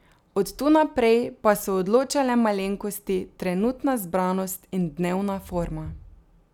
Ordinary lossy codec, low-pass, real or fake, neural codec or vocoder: none; 19.8 kHz; real; none